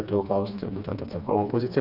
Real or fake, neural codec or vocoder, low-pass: fake; codec, 16 kHz, 2 kbps, FreqCodec, smaller model; 5.4 kHz